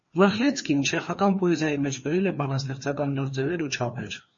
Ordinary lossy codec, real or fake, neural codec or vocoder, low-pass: MP3, 32 kbps; fake; codec, 16 kHz, 2 kbps, FreqCodec, larger model; 7.2 kHz